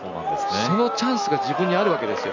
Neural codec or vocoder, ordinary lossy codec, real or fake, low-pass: none; none; real; 7.2 kHz